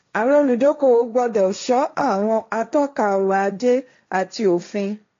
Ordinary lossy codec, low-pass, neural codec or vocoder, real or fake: AAC, 48 kbps; 7.2 kHz; codec, 16 kHz, 1.1 kbps, Voila-Tokenizer; fake